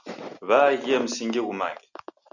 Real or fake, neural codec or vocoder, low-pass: real; none; 7.2 kHz